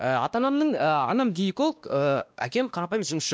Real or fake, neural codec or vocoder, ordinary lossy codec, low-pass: fake; codec, 16 kHz, 1 kbps, X-Codec, WavLM features, trained on Multilingual LibriSpeech; none; none